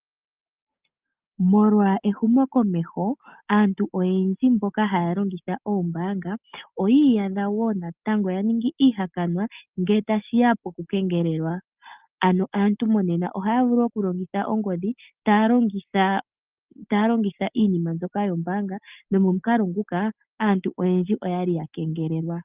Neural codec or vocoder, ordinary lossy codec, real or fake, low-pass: none; Opus, 24 kbps; real; 3.6 kHz